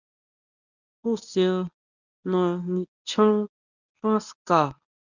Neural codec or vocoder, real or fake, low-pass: codec, 24 kHz, 0.9 kbps, WavTokenizer, medium speech release version 1; fake; 7.2 kHz